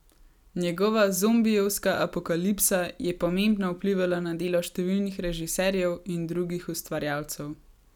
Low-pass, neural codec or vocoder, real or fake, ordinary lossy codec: 19.8 kHz; none; real; none